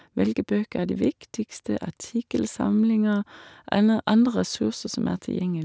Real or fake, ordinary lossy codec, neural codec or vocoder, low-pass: real; none; none; none